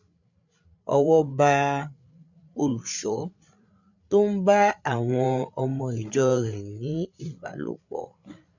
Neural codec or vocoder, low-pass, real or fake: codec, 16 kHz, 8 kbps, FreqCodec, larger model; 7.2 kHz; fake